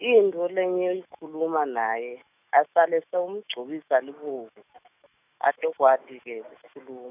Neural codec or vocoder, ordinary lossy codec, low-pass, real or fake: autoencoder, 48 kHz, 128 numbers a frame, DAC-VAE, trained on Japanese speech; none; 3.6 kHz; fake